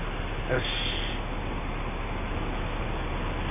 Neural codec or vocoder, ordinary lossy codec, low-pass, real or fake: none; none; 3.6 kHz; real